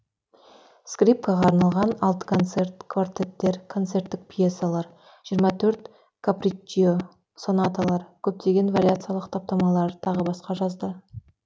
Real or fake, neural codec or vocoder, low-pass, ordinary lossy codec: real; none; none; none